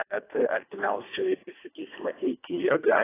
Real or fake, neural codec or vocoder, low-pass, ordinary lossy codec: fake; codec, 24 kHz, 1.5 kbps, HILCodec; 3.6 kHz; AAC, 16 kbps